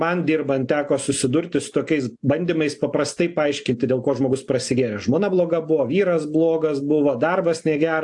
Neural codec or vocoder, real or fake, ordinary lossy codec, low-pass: none; real; AAC, 64 kbps; 10.8 kHz